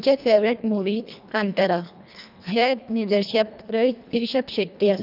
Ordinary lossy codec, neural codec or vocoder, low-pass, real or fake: none; codec, 24 kHz, 1.5 kbps, HILCodec; 5.4 kHz; fake